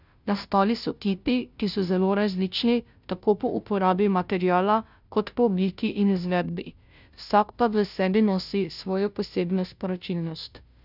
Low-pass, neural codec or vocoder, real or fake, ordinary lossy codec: 5.4 kHz; codec, 16 kHz, 0.5 kbps, FunCodec, trained on Chinese and English, 25 frames a second; fake; none